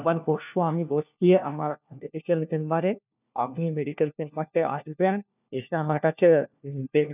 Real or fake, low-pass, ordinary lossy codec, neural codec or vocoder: fake; 3.6 kHz; none; codec, 16 kHz, 1 kbps, FunCodec, trained on LibriTTS, 50 frames a second